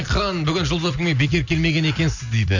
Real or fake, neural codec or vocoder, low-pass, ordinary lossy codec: real; none; 7.2 kHz; none